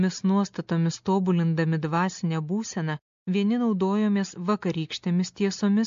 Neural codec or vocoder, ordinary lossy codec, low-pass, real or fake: none; AAC, 64 kbps; 7.2 kHz; real